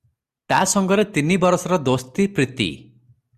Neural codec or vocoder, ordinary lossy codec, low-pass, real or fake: none; Opus, 64 kbps; 14.4 kHz; real